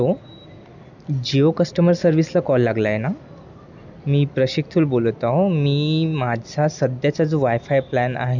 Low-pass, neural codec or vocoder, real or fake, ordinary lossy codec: 7.2 kHz; none; real; none